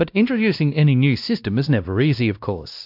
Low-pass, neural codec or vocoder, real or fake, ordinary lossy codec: 5.4 kHz; codec, 16 kHz, 1 kbps, X-Codec, WavLM features, trained on Multilingual LibriSpeech; fake; AAC, 48 kbps